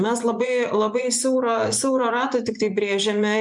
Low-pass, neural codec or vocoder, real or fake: 10.8 kHz; vocoder, 24 kHz, 100 mel bands, Vocos; fake